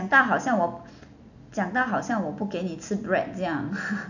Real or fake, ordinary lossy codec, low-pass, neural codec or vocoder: real; none; 7.2 kHz; none